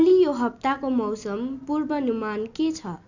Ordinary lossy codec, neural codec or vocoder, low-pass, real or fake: none; none; 7.2 kHz; real